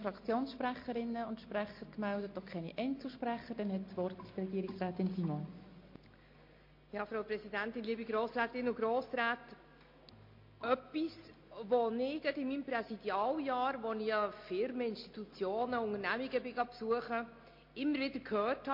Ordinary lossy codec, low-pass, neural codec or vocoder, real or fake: MP3, 32 kbps; 5.4 kHz; none; real